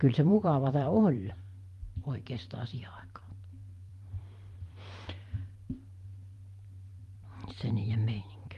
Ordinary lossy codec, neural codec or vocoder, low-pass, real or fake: Opus, 32 kbps; vocoder, 44.1 kHz, 128 mel bands every 512 samples, BigVGAN v2; 14.4 kHz; fake